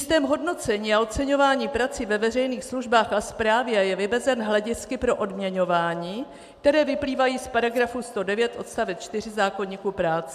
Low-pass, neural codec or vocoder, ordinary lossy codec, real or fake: 14.4 kHz; none; AAC, 96 kbps; real